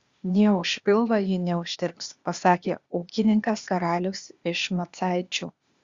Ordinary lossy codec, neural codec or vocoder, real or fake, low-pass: Opus, 64 kbps; codec, 16 kHz, 0.8 kbps, ZipCodec; fake; 7.2 kHz